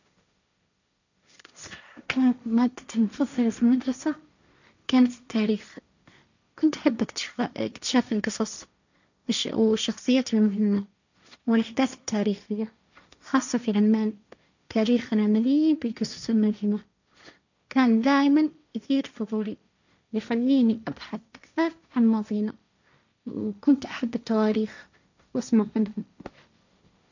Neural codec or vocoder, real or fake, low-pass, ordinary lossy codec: codec, 16 kHz, 1.1 kbps, Voila-Tokenizer; fake; 7.2 kHz; none